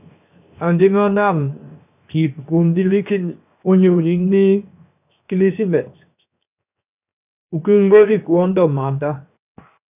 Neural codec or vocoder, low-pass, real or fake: codec, 16 kHz, 0.7 kbps, FocalCodec; 3.6 kHz; fake